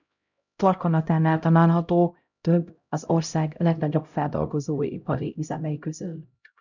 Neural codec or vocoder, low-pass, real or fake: codec, 16 kHz, 0.5 kbps, X-Codec, HuBERT features, trained on LibriSpeech; 7.2 kHz; fake